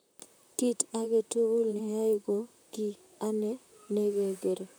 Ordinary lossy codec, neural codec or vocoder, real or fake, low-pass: none; vocoder, 44.1 kHz, 128 mel bands, Pupu-Vocoder; fake; none